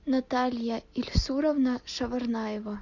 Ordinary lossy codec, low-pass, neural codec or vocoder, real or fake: MP3, 64 kbps; 7.2 kHz; none; real